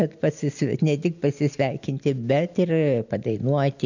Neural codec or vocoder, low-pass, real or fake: none; 7.2 kHz; real